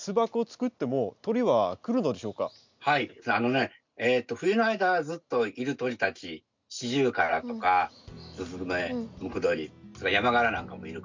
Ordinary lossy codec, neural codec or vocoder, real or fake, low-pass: MP3, 64 kbps; none; real; 7.2 kHz